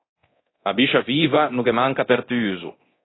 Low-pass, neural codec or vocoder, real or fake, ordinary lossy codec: 7.2 kHz; codec, 24 kHz, 0.9 kbps, DualCodec; fake; AAC, 16 kbps